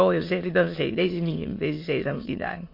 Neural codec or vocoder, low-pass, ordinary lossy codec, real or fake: autoencoder, 22.05 kHz, a latent of 192 numbers a frame, VITS, trained on many speakers; 5.4 kHz; MP3, 32 kbps; fake